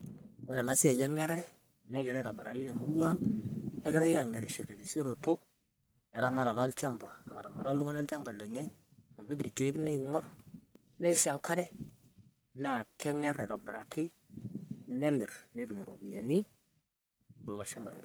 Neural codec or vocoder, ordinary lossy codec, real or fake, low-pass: codec, 44.1 kHz, 1.7 kbps, Pupu-Codec; none; fake; none